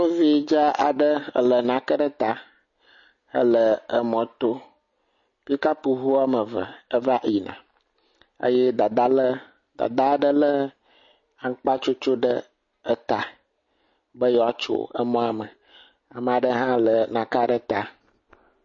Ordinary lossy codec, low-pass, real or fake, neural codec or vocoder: MP3, 32 kbps; 7.2 kHz; real; none